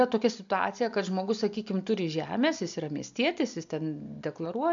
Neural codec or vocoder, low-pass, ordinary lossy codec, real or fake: none; 7.2 kHz; MP3, 64 kbps; real